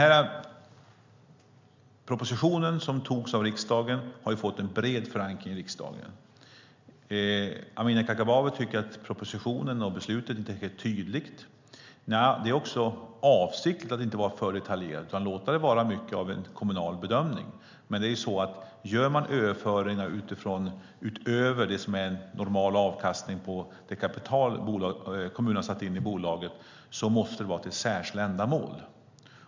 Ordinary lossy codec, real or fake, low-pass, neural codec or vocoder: MP3, 64 kbps; real; 7.2 kHz; none